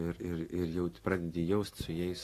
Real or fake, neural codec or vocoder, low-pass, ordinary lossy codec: real; none; 14.4 kHz; AAC, 48 kbps